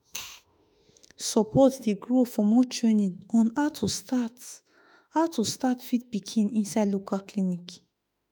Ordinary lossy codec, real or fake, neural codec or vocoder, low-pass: none; fake; autoencoder, 48 kHz, 32 numbers a frame, DAC-VAE, trained on Japanese speech; none